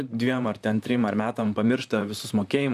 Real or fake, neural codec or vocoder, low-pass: fake; vocoder, 44.1 kHz, 128 mel bands, Pupu-Vocoder; 14.4 kHz